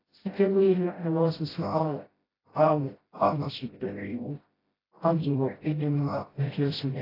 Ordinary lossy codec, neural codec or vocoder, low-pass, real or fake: AAC, 24 kbps; codec, 16 kHz, 0.5 kbps, FreqCodec, smaller model; 5.4 kHz; fake